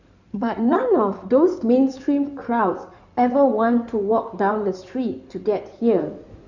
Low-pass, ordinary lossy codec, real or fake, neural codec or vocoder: 7.2 kHz; none; fake; codec, 16 kHz in and 24 kHz out, 2.2 kbps, FireRedTTS-2 codec